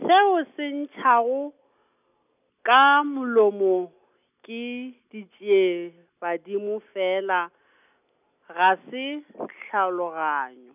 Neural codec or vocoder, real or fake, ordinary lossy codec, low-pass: none; real; none; 3.6 kHz